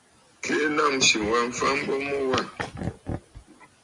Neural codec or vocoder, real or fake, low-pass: none; real; 10.8 kHz